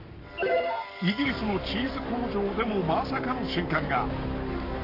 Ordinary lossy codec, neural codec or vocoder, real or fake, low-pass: Opus, 64 kbps; codec, 44.1 kHz, 7.8 kbps, Pupu-Codec; fake; 5.4 kHz